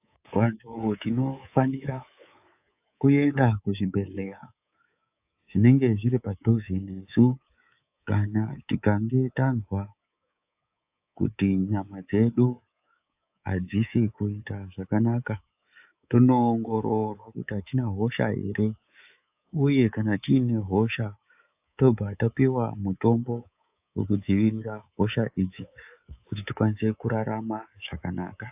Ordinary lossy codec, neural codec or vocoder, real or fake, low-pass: AAC, 32 kbps; codec, 24 kHz, 3.1 kbps, DualCodec; fake; 3.6 kHz